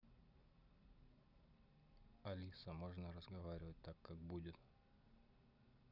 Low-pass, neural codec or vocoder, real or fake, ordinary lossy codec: 5.4 kHz; none; real; none